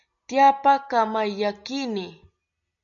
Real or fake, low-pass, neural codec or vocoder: real; 7.2 kHz; none